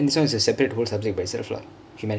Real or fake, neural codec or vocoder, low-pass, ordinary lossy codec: real; none; none; none